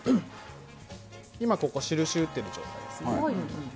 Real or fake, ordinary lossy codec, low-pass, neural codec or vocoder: real; none; none; none